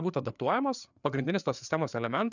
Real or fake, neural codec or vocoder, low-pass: fake; codec, 16 kHz, 4 kbps, FreqCodec, larger model; 7.2 kHz